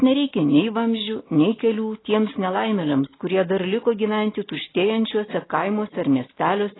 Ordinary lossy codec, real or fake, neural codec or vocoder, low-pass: AAC, 16 kbps; real; none; 7.2 kHz